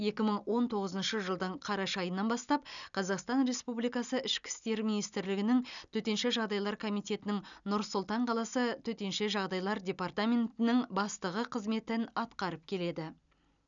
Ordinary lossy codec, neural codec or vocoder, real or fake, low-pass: none; none; real; 7.2 kHz